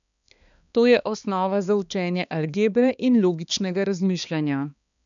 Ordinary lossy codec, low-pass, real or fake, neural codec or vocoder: none; 7.2 kHz; fake; codec, 16 kHz, 2 kbps, X-Codec, HuBERT features, trained on balanced general audio